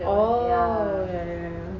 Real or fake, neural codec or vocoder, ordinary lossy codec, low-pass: real; none; none; 7.2 kHz